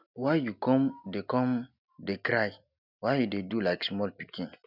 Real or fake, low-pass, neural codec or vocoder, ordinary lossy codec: real; 5.4 kHz; none; none